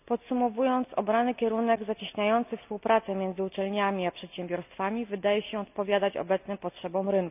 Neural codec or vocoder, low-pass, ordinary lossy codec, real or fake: none; 3.6 kHz; none; real